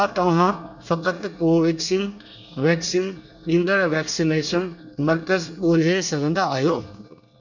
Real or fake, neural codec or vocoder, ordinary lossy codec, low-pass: fake; codec, 24 kHz, 1 kbps, SNAC; none; 7.2 kHz